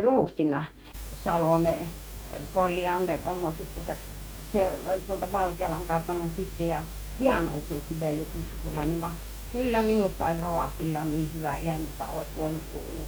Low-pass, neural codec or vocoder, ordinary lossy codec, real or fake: none; codec, 44.1 kHz, 2.6 kbps, DAC; none; fake